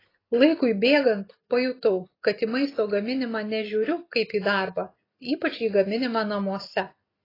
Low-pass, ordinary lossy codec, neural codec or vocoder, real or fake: 5.4 kHz; AAC, 24 kbps; none; real